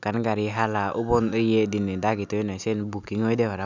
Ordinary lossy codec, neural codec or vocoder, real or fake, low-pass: none; none; real; 7.2 kHz